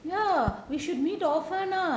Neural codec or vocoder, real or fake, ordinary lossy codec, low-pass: none; real; none; none